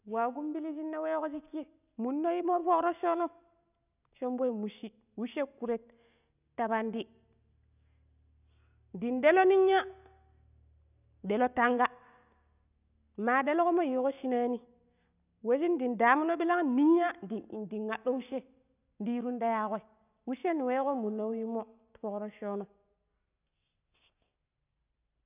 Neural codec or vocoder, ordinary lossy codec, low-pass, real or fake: none; none; 3.6 kHz; real